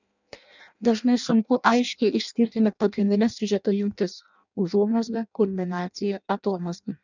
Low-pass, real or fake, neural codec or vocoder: 7.2 kHz; fake; codec, 16 kHz in and 24 kHz out, 0.6 kbps, FireRedTTS-2 codec